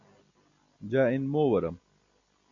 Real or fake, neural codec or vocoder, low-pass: real; none; 7.2 kHz